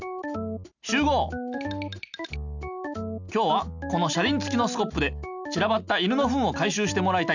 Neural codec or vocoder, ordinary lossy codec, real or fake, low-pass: none; none; real; 7.2 kHz